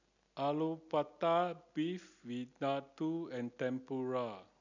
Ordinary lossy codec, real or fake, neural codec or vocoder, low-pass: none; real; none; 7.2 kHz